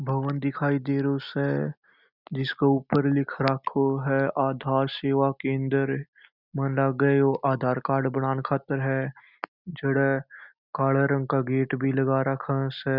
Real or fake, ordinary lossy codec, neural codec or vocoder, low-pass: real; none; none; 5.4 kHz